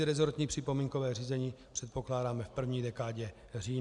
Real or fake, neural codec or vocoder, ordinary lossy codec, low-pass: real; none; MP3, 96 kbps; 10.8 kHz